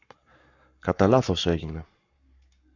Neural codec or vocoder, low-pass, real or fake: codec, 44.1 kHz, 7.8 kbps, Pupu-Codec; 7.2 kHz; fake